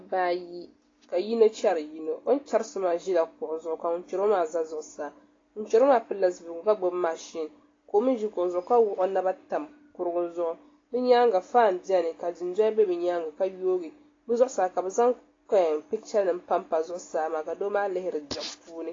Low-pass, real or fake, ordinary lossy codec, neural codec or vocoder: 7.2 kHz; real; AAC, 32 kbps; none